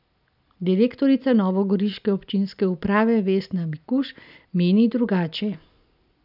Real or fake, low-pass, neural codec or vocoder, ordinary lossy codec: real; 5.4 kHz; none; none